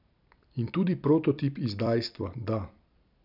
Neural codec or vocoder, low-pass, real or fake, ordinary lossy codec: vocoder, 44.1 kHz, 128 mel bands every 256 samples, BigVGAN v2; 5.4 kHz; fake; none